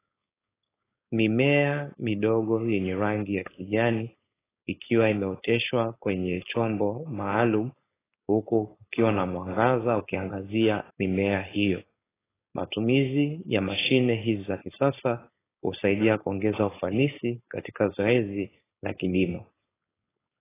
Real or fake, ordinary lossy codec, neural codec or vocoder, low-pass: fake; AAC, 16 kbps; codec, 16 kHz, 4.8 kbps, FACodec; 3.6 kHz